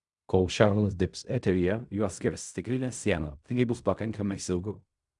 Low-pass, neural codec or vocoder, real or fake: 10.8 kHz; codec, 16 kHz in and 24 kHz out, 0.4 kbps, LongCat-Audio-Codec, fine tuned four codebook decoder; fake